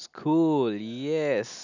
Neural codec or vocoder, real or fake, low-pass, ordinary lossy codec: none; real; 7.2 kHz; none